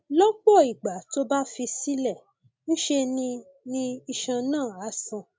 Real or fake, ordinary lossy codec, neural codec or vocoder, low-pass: real; none; none; none